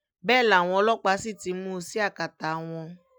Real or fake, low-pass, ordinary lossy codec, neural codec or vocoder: real; none; none; none